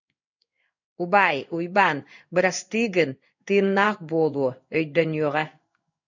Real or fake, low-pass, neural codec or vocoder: fake; 7.2 kHz; codec, 16 kHz in and 24 kHz out, 1 kbps, XY-Tokenizer